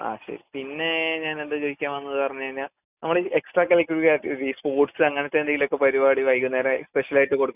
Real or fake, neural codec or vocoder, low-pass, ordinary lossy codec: real; none; 3.6 kHz; none